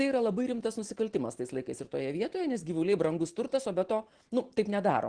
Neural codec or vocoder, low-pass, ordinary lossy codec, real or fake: none; 9.9 kHz; Opus, 16 kbps; real